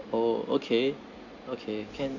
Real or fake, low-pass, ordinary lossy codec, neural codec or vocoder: real; 7.2 kHz; none; none